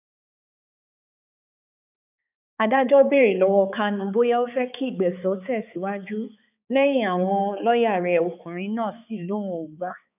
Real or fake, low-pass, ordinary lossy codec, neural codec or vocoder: fake; 3.6 kHz; none; codec, 16 kHz, 4 kbps, X-Codec, HuBERT features, trained on balanced general audio